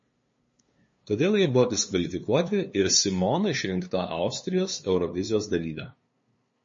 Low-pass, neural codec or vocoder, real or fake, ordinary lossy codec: 7.2 kHz; codec, 16 kHz, 2 kbps, FunCodec, trained on LibriTTS, 25 frames a second; fake; MP3, 32 kbps